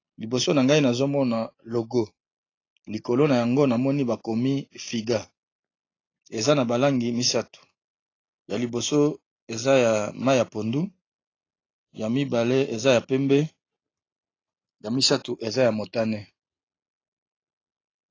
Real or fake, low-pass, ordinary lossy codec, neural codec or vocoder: real; 7.2 kHz; AAC, 32 kbps; none